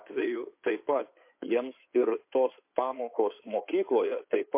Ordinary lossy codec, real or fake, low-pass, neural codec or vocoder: MP3, 24 kbps; fake; 3.6 kHz; codec, 16 kHz in and 24 kHz out, 2.2 kbps, FireRedTTS-2 codec